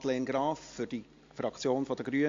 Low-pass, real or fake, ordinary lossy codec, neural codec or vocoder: 7.2 kHz; real; AAC, 48 kbps; none